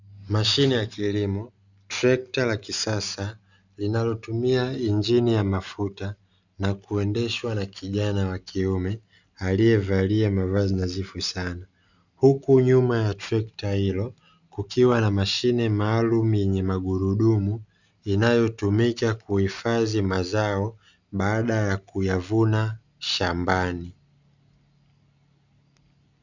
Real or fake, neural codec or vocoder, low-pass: real; none; 7.2 kHz